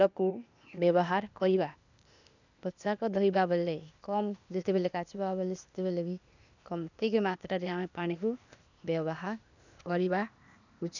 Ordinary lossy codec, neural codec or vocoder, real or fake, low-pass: none; codec, 16 kHz, 0.8 kbps, ZipCodec; fake; 7.2 kHz